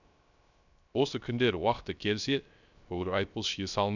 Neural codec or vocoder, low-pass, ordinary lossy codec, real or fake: codec, 16 kHz, 0.3 kbps, FocalCodec; 7.2 kHz; none; fake